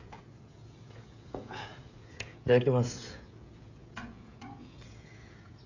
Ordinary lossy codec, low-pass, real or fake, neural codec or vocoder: none; 7.2 kHz; fake; codec, 16 kHz, 16 kbps, FreqCodec, smaller model